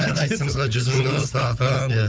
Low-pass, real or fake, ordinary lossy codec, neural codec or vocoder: none; fake; none; codec, 16 kHz, 4 kbps, FunCodec, trained on Chinese and English, 50 frames a second